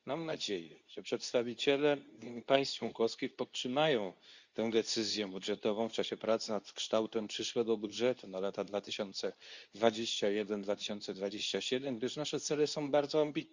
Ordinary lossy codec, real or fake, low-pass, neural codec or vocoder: Opus, 64 kbps; fake; 7.2 kHz; codec, 24 kHz, 0.9 kbps, WavTokenizer, medium speech release version 2